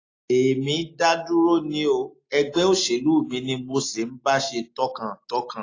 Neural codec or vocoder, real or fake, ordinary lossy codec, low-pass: none; real; AAC, 32 kbps; 7.2 kHz